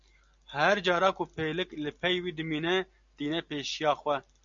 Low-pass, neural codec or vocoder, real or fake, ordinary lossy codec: 7.2 kHz; none; real; MP3, 64 kbps